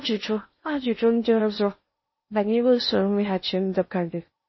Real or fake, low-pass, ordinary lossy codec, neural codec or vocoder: fake; 7.2 kHz; MP3, 24 kbps; codec, 16 kHz in and 24 kHz out, 0.6 kbps, FocalCodec, streaming, 4096 codes